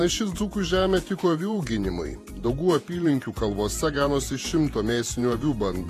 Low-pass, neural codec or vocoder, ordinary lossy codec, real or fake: 14.4 kHz; vocoder, 48 kHz, 128 mel bands, Vocos; AAC, 48 kbps; fake